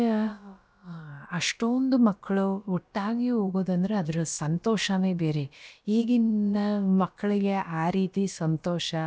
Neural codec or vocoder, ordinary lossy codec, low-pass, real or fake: codec, 16 kHz, about 1 kbps, DyCAST, with the encoder's durations; none; none; fake